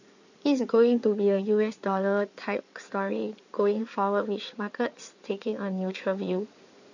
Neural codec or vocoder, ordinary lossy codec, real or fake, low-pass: codec, 16 kHz in and 24 kHz out, 2.2 kbps, FireRedTTS-2 codec; none; fake; 7.2 kHz